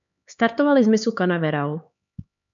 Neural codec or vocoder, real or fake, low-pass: codec, 16 kHz, 4 kbps, X-Codec, HuBERT features, trained on LibriSpeech; fake; 7.2 kHz